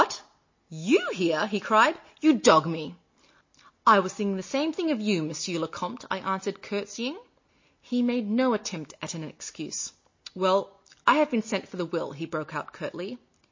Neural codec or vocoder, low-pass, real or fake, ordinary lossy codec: none; 7.2 kHz; real; MP3, 32 kbps